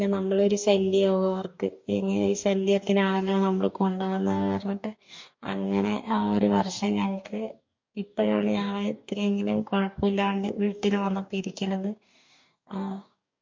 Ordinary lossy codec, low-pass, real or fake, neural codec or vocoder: MP3, 48 kbps; 7.2 kHz; fake; codec, 44.1 kHz, 2.6 kbps, DAC